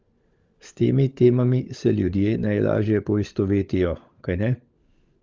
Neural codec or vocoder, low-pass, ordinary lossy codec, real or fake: codec, 16 kHz, 16 kbps, FunCodec, trained on LibriTTS, 50 frames a second; 7.2 kHz; Opus, 32 kbps; fake